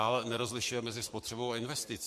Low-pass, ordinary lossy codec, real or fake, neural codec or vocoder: 14.4 kHz; MP3, 64 kbps; fake; codec, 44.1 kHz, 7.8 kbps, Pupu-Codec